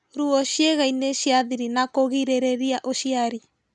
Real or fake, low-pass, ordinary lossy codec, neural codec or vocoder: real; 10.8 kHz; none; none